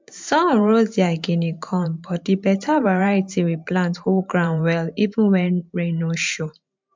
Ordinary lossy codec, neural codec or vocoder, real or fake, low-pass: MP3, 64 kbps; vocoder, 44.1 kHz, 128 mel bands every 512 samples, BigVGAN v2; fake; 7.2 kHz